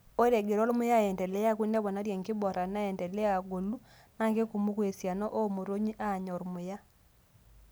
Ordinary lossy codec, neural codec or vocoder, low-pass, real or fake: none; none; none; real